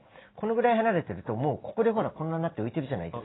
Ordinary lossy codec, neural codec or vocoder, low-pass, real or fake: AAC, 16 kbps; none; 7.2 kHz; real